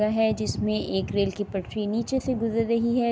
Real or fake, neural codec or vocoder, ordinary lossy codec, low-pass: real; none; none; none